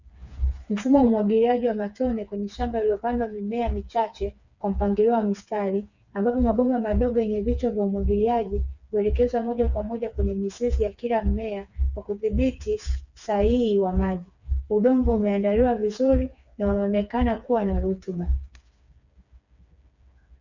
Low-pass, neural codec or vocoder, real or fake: 7.2 kHz; codec, 16 kHz, 4 kbps, FreqCodec, smaller model; fake